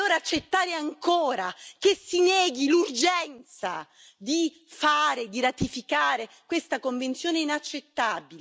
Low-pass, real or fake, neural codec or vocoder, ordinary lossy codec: none; real; none; none